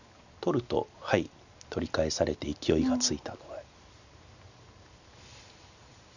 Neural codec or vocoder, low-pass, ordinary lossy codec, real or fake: none; 7.2 kHz; none; real